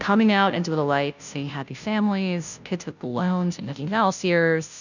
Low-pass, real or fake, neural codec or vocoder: 7.2 kHz; fake; codec, 16 kHz, 0.5 kbps, FunCodec, trained on Chinese and English, 25 frames a second